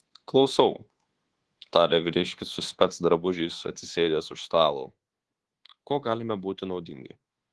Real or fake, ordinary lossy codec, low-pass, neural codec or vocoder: fake; Opus, 16 kbps; 10.8 kHz; codec, 24 kHz, 1.2 kbps, DualCodec